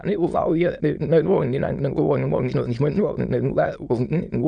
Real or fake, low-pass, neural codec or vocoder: fake; 9.9 kHz; autoencoder, 22.05 kHz, a latent of 192 numbers a frame, VITS, trained on many speakers